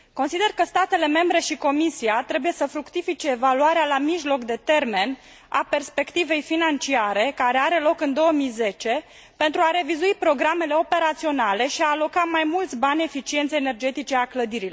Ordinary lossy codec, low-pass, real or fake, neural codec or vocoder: none; none; real; none